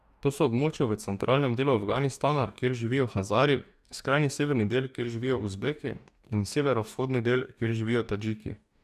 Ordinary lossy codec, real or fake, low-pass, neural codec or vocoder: none; fake; 14.4 kHz; codec, 44.1 kHz, 2.6 kbps, DAC